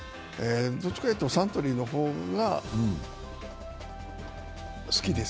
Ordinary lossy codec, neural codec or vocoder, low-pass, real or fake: none; none; none; real